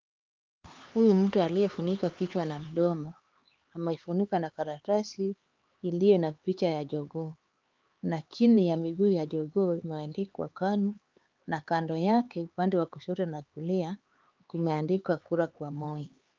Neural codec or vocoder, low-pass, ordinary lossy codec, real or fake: codec, 16 kHz, 4 kbps, X-Codec, HuBERT features, trained on LibriSpeech; 7.2 kHz; Opus, 32 kbps; fake